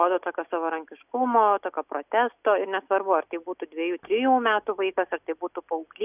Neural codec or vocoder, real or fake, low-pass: none; real; 3.6 kHz